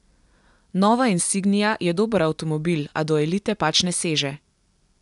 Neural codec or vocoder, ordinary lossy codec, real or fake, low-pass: none; none; real; 10.8 kHz